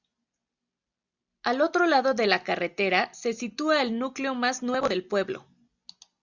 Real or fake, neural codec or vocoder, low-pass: real; none; 7.2 kHz